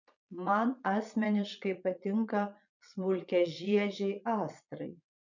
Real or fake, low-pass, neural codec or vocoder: fake; 7.2 kHz; vocoder, 22.05 kHz, 80 mel bands, Vocos